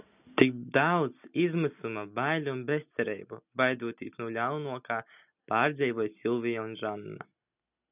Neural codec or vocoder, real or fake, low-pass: none; real; 3.6 kHz